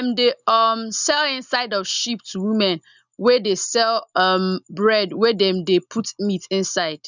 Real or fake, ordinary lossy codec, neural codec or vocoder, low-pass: real; none; none; 7.2 kHz